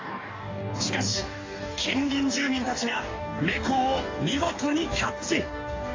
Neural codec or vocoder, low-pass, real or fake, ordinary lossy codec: codec, 44.1 kHz, 2.6 kbps, DAC; 7.2 kHz; fake; AAC, 32 kbps